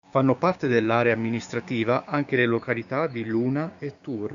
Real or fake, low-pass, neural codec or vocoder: fake; 7.2 kHz; codec, 16 kHz, 6 kbps, DAC